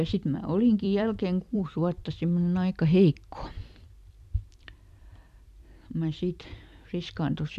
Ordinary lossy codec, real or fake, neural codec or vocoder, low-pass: none; fake; vocoder, 44.1 kHz, 128 mel bands every 256 samples, BigVGAN v2; 14.4 kHz